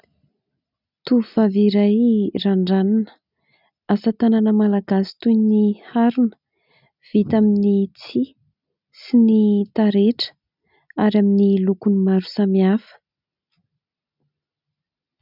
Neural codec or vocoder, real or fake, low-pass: none; real; 5.4 kHz